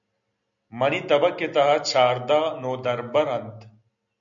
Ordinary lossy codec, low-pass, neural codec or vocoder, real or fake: MP3, 96 kbps; 7.2 kHz; none; real